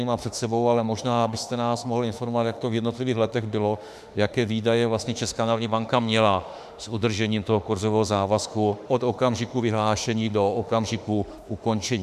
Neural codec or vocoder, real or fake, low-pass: autoencoder, 48 kHz, 32 numbers a frame, DAC-VAE, trained on Japanese speech; fake; 14.4 kHz